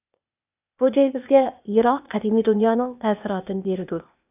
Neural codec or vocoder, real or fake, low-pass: codec, 16 kHz, 0.8 kbps, ZipCodec; fake; 3.6 kHz